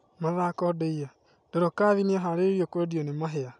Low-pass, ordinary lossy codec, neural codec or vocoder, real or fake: 10.8 kHz; none; none; real